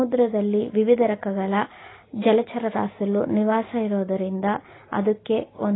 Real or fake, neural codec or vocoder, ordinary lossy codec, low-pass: real; none; AAC, 16 kbps; 7.2 kHz